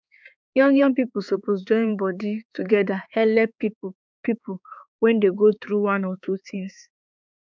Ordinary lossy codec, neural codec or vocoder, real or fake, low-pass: none; codec, 16 kHz, 4 kbps, X-Codec, HuBERT features, trained on balanced general audio; fake; none